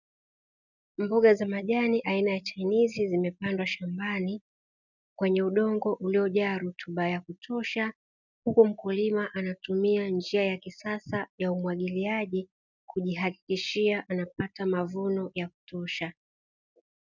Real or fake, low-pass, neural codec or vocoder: real; 7.2 kHz; none